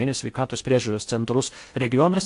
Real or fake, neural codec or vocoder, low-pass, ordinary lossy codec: fake; codec, 16 kHz in and 24 kHz out, 0.6 kbps, FocalCodec, streaming, 4096 codes; 10.8 kHz; AAC, 64 kbps